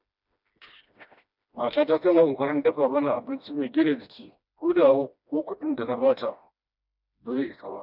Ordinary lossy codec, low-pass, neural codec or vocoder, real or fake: none; 5.4 kHz; codec, 16 kHz, 1 kbps, FreqCodec, smaller model; fake